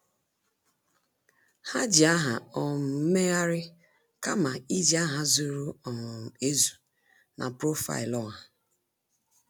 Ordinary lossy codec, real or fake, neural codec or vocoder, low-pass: none; real; none; none